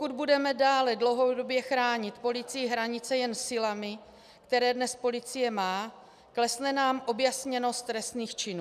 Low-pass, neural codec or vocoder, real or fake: 14.4 kHz; none; real